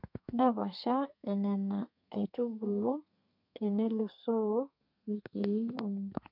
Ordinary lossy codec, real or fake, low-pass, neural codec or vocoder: MP3, 48 kbps; fake; 5.4 kHz; codec, 32 kHz, 1.9 kbps, SNAC